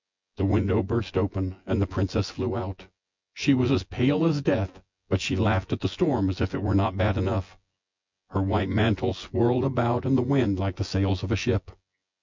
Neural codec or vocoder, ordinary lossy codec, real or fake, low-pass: vocoder, 24 kHz, 100 mel bands, Vocos; MP3, 64 kbps; fake; 7.2 kHz